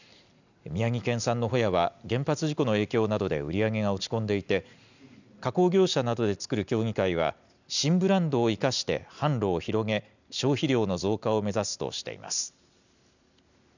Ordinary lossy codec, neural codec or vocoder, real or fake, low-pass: none; none; real; 7.2 kHz